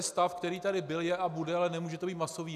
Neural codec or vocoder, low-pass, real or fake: none; 14.4 kHz; real